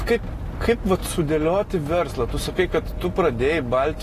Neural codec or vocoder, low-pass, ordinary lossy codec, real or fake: none; 14.4 kHz; AAC, 48 kbps; real